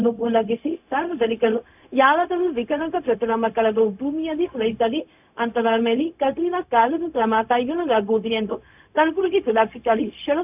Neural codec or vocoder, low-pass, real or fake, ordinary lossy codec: codec, 16 kHz, 0.4 kbps, LongCat-Audio-Codec; 3.6 kHz; fake; none